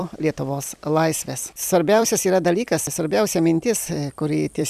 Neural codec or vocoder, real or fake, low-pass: vocoder, 44.1 kHz, 128 mel bands every 256 samples, BigVGAN v2; fake; 14.4 kHz